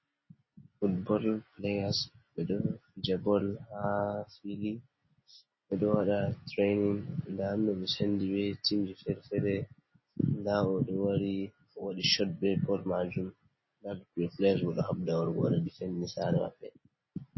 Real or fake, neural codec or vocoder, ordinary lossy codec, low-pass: real; none; MP3, 24 kbps; 7.2 kHz